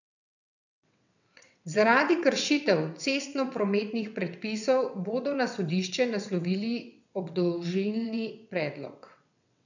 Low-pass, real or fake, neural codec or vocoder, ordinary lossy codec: 7.2 kHz; fake; vocoder, 22.05 kHz, 80 mel bands, WaveNeXt; none